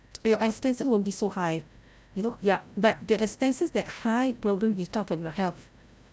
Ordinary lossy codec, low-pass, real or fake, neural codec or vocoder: none; none; fake; codec, 16 kHz, 0.5 kbps, FreqCodec, larger model